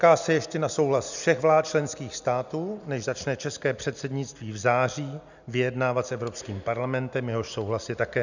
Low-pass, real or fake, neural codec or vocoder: 7.2 kHz; real; none